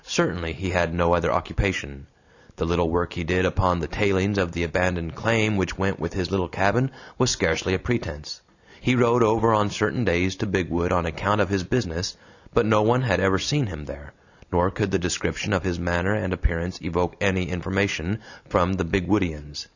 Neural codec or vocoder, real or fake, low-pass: none; real; 7.2 kHz